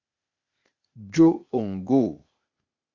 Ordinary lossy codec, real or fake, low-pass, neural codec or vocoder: Opus, 64 kbps; fake; 7.2 kHz; codec, 16 kHz, 0.8 kbps, ZipCodec